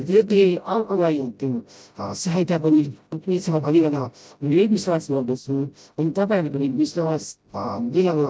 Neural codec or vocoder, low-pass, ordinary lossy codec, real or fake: codec, 16 kHz, 0.5 kbps, FreqCodec, smaller model; none; none; fake